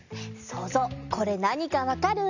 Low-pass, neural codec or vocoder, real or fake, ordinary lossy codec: 7.2 kHz; none; real; none